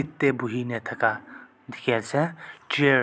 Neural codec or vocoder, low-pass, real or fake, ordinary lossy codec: none; none; real; none